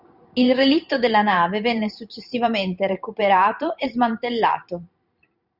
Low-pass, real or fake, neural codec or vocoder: 5.4 kHz; fake; vocoder, 44.1 kHz, 128 mel bands every 512 samples, BigVGAN v2